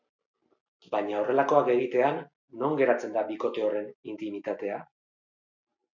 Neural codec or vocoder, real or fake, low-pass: none; real; 7.2 kHz